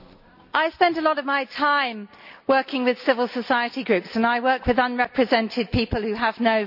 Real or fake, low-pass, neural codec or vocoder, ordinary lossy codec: real; 5.4 kHz; none; none